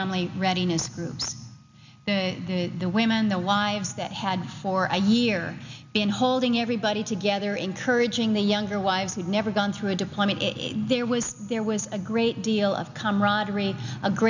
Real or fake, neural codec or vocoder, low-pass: real; none; 7.2 kHz